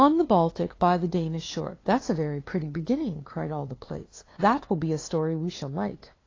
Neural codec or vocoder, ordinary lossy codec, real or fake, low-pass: codec, 16 kHz, 2 kbps, FunCodec, trained on LibriTTS, 25 frames a second; AAC, 32 kbps; fake; 7.2 kHz